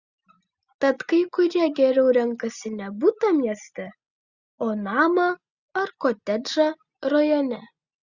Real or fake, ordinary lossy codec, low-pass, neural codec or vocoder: real; Opus, 64 kbps; 7.2 kHz; none